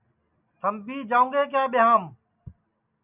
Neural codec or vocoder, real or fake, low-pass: none; real; 3.6 kHz